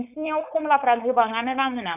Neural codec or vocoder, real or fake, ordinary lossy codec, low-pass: codec, 16 kHz, 4.8 kbps, FACodec; fake; none; 3.6 kHz